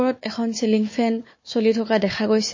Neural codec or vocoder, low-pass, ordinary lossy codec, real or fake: codec, 16 kHz, 4 kbps, FunCodec, trained on Chinese and English, 50 frames a second; 7.2 kHz; MP3, 32 kbps; fake